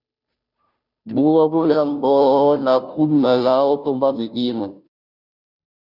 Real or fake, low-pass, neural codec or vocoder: fake; 5.4 kHz; codec, 16 kHz, 0.5 kbps, FunCodec, trained on Chinese and English, 25 frames a second